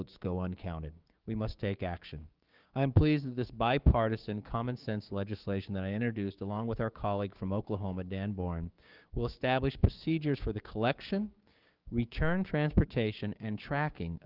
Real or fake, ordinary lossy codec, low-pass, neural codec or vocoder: fake; Opus, 32 kbps; 5.4 kHz; codec, 16 kHz, 6 kbps, DAC